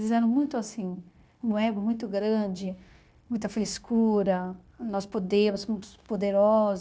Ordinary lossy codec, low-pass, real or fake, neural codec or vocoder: none; none; fake; codec, 16 kHz, 0.9 kbps, LongCat-Audio-Codec